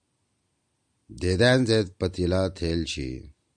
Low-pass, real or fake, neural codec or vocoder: 9.9 kHz; real; none